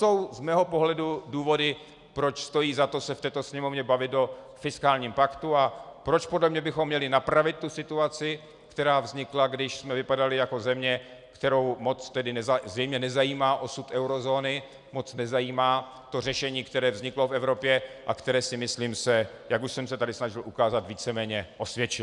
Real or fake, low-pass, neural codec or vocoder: real; 10.8 kHz; none